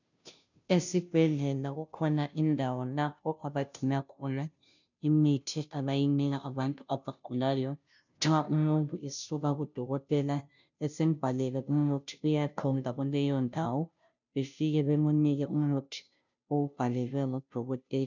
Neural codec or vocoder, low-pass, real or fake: codec, 16 kHz, 0.5 kbps, FunCodec, trained on Chinese and English, 25 frames a second; 7.2 kHz; fake